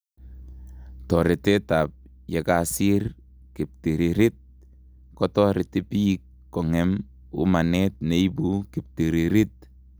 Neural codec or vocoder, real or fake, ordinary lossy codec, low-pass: none; real; none; none